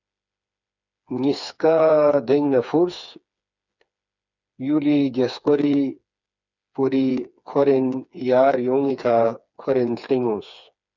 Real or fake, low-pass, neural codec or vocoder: fake; 7.2 kHz; codec, 16 kHz, 4 kbps, FreqCodec, smaller model